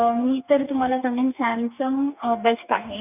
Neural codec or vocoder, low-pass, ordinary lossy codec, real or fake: codec, 44.1 kHz, 2.6 kbps, DAC; 3.6 kHz; none; fake